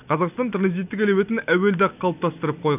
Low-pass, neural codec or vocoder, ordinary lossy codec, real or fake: 3.6 kHz; none; none; real